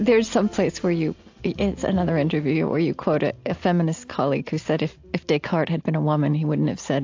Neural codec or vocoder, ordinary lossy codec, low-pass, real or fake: none; AAC, 48 kbps; 7.2 kHz; real